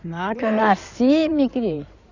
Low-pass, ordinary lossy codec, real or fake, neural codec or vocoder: 7.2 kHz; none; fake; codec, 16 kHz in and 24 kHz out, 2.2 kbps, FireRedTTS-2 codec